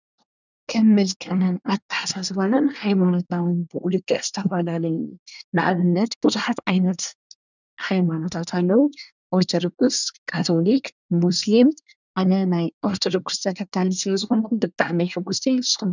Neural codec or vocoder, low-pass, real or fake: codec, 24 kHz, 1 kbps, SNAC; 7.2 kHz; fake